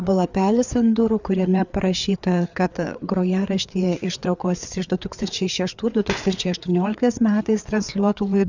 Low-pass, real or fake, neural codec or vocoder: 7.2 kHz; fake; codec, 16 kHz, 4 kbps, FreqCodec, larger model